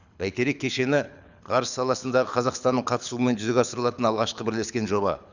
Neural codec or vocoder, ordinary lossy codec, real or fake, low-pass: codec, 24 kHz, 6 kbps, HILCodec; none; fake; 7.2 kHz